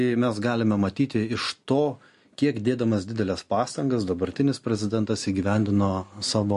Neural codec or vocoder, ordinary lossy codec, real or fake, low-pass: none; MP3, 48 kbps; real; 14.4 kHz